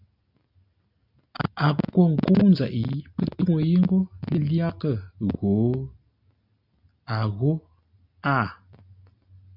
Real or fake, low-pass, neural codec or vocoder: real; 5.4 kHz; none